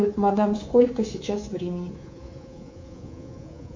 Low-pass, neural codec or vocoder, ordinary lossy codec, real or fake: 7.2 kHz; codec, 24 kHz, 3.1 kbps, DualCodec; MP3, 48 kbps; fake